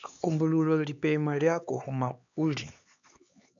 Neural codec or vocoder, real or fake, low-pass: codec, 16 kHz, 4 kbps, X-Codec, HuBERT features, trained on LibriSpeech; fake; 7.2 kHz